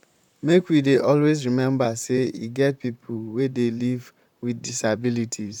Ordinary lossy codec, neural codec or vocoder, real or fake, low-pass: none; vocoder, 44.1 kHz, 128 mel bands, Pupu-Vocoder; fake; 19.8 kHz